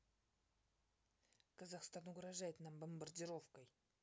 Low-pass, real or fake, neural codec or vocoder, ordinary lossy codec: none; real; none; none